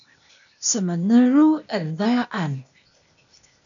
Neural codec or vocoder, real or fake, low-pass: codec, 16 kHz, 0.8 kbps, ZipCodec; fake; 7.2 kHz